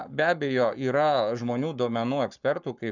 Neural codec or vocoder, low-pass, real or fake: codec, 44.1 kHz, 7.8 kbps, Pupu-Codec; 7.2 kHz; fake